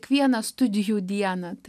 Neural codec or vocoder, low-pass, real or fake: none; 14.4 kHz; real